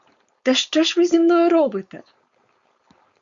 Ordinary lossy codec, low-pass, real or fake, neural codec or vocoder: Opus, 64 kbps; 7.2 kHz; fake; codec, 16 kHz, 4.8 kbps, FACodec